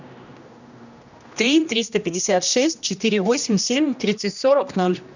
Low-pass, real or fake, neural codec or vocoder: 7.2 kHz; fake; codec, 16 kHz, 1 kbps, X-Codec, HuBERT features, trained on general audio